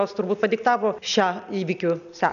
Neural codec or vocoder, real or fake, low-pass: none; real; 7.2 kHz